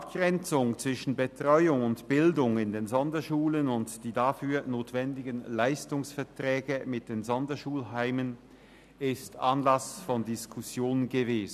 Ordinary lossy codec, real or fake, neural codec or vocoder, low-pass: none; real; none; 14.4 kHz